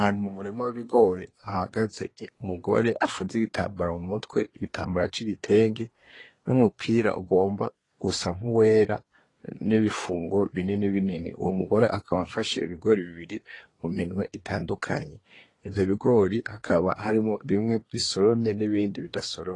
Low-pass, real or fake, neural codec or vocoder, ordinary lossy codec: 10.8 kHz; fake; codec, 24 kHz, 1 kbps, SNAC; AAC, 32 kbps